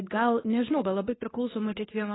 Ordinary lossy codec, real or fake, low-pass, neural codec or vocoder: AAC, 16 kbps; fake; 7.2 kHz; codec, 24 kHz, 0.9 kbps, WavTokenizer, medium speech release version 2